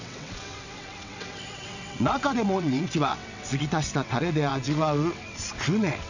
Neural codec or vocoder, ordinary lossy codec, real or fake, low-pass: vocoder, 22.05 kHz, 80 mel bands, WaveNeXt; none; fake; 7.2 kHz